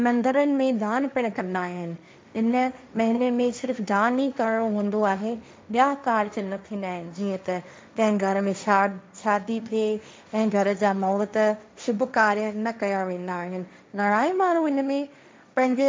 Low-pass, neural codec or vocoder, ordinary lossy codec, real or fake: none; codec, 16 kHz, 1.1 kbps, Voila-Tokenizer; none; fake